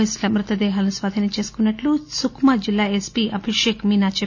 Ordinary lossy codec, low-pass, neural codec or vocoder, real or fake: none; 7.2 kHz; none; real